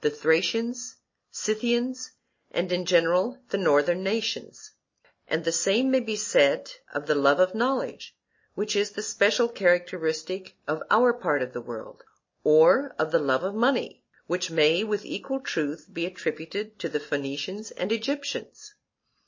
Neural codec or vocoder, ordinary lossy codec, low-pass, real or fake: none; MP3, 32 kbps; 7.2 kHz; real